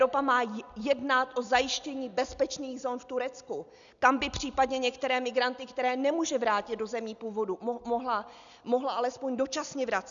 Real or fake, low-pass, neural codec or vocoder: real; 7.2 kHz; none